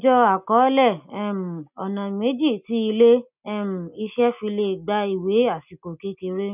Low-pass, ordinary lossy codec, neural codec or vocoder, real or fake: 3.6 kHz; none; none; real